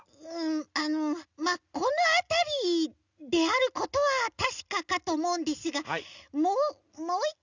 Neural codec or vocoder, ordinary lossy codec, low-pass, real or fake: none; none; 7.2 kHz; real